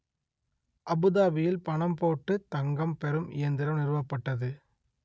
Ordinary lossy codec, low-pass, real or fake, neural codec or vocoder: none; none; real; none